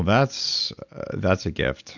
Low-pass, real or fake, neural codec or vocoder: 7.2 kHz; real; none